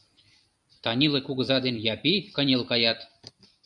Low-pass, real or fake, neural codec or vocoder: 10.8 kHz; fake; vocoder, 44.1 kHz, 128 mel bands every 256 samples, BigVGAN v2